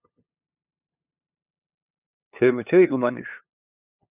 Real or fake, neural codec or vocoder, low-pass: fake; codec, 16 kHz, 2 kbps, FunCodec, trained on LibriTTS, 25 frames a second; 3.6 kHz